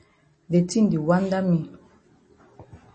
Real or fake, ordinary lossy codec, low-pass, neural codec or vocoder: real; MP3, 32 kbps; 10.8 kHz; none